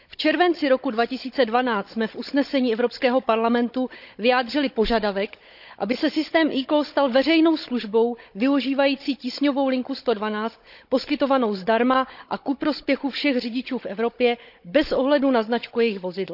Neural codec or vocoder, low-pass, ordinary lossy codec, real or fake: codec, 16 kHz, 16 kbps, FunCodec, trained on Chinese and English, 50 frames a second; 5.4 kHz; none; fake